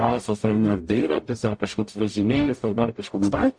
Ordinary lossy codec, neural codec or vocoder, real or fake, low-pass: MP3, 48 kbps; codec, 44.1 kHz, 0.9 kbps, DAC; fake; 9.9 kHz